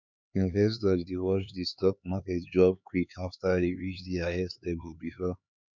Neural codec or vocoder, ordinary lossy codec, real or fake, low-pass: codec, 16 kHz, 4 kbps, X-Codec, HuBERT features, trained on LibriSpeech; none; fake; 7.2 kHz